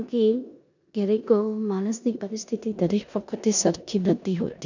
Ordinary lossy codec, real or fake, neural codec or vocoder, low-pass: none; fake; codec, 16 kHz in and 24 kHz out, 0.9 kbps, LongCat-Audio-Codec, four codebook decoder; 7.2 kHz